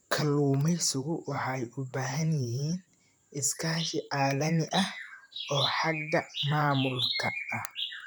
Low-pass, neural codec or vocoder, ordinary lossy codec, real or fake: none; vocoder, 44.1 kHz, 128 mel bands, Pupu-Vocoder; none; fake